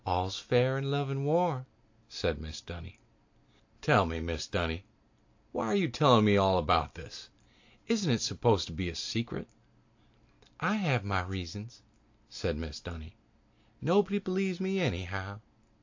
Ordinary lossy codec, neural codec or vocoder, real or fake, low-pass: AAC, 48 kbps; none; real; 7.2 kHz